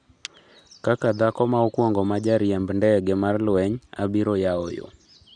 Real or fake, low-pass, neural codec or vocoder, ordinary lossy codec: real; 9.9 kHz; none; none